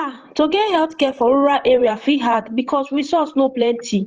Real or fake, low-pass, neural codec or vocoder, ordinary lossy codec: fake; 7.2 kHz; vocoder, 44.1 kHz, 128 mel bands, Pupu-Vocoder; Opus, 16 kbps